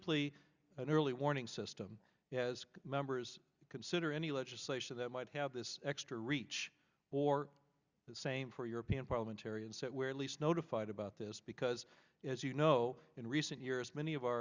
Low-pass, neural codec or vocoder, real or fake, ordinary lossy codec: 7.2 kHz; none; real; Opus, 64 kbps